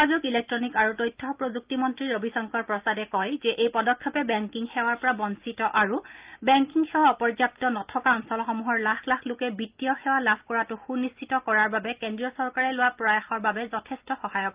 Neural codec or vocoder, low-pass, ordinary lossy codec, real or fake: none; 3.6 kHz; Opus, 24 kbps; real